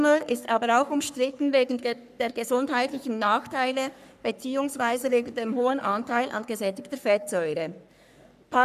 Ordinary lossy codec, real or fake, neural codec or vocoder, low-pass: none; fake; codec, 44.1 kHz, 3.4 kbps, Pupu-Codec; 14.4 kHz